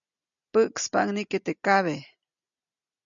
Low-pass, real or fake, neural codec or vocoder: 7.2 kHz; real; none